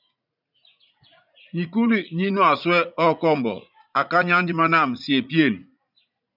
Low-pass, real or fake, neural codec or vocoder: 5.4 kHz; fake; vocoder, 44.1 kHz, 80 mel bands, Vocos